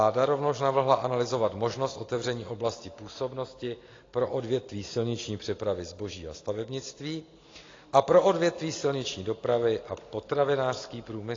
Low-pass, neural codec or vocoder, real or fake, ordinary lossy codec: 7.2 kHz; none; real; AAC, 32 kbps